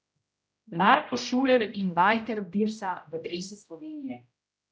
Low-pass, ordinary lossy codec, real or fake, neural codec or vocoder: none; none; fake; codec, 16 kHz, 0.5 kbps, X-Codec, HuBERT features, trained on general audio